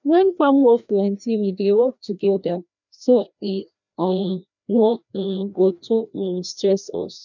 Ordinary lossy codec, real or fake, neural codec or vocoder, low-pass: none; fake; codec, 16 kHz, 1 kbps, FreqCodec, larger model; 7.2 kHz